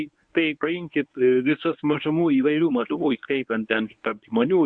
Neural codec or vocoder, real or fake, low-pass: codec, 24 kHz, 0.9 kbps, WavTokenizer, medium speech release version 1; fake; 9.9 kHz